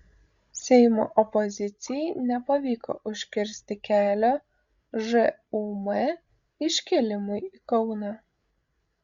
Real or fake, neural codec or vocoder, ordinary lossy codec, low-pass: fake; codec, 16 kHz, 16 kbps, FreqCodec, larger model; Opus, 64 kbps; 7.2 kHz